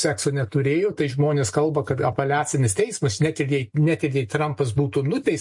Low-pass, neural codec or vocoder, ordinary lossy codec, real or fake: 10.8 kHz; none; MP3, 48 kbps; real